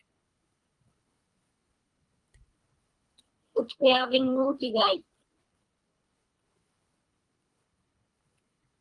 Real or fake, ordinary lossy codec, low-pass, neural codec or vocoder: fake; Opus, 32 kbps; 10.8 kHz; codec, 24 kHz, 3 kbps, HILCodec